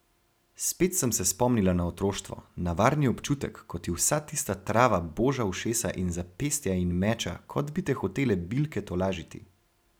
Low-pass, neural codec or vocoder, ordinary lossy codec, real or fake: none; none; none; real